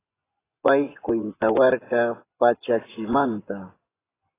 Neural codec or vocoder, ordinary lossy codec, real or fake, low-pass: vocoder, 24 kHz, 100 mel bands, Vocos; AAC, 16 kbps; fake; 3.6 kHz